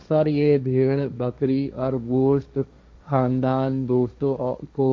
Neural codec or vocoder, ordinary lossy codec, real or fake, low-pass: codec, 16 kHz, 1.1 kbps, Voila-Tokenizer; none; fake; none